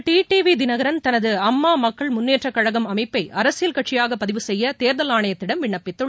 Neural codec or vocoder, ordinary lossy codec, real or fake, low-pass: none; none; real; none